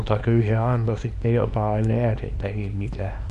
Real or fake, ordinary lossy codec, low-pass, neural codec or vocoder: fake; none; 10.8 kHz; codec, 24 kHz, 0.9 kbps, WavTokenizer, small release